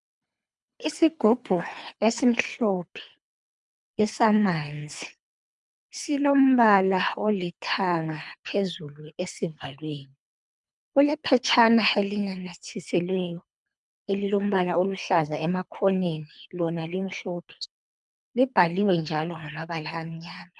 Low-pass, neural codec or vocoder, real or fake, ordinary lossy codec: 10.8 kHz; codec, 24 kHz, 3 kbps, HILCodec; fake; MP3, 96 kbps